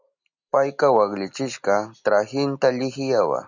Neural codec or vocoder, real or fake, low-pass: none; real; 7.2 kHz